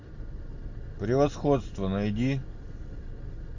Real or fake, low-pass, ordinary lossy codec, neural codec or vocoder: real; 7.2 kHz; AAC, 48 kbps; none